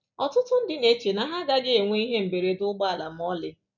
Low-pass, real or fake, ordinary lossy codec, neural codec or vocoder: 7.2 kHz; real; none; none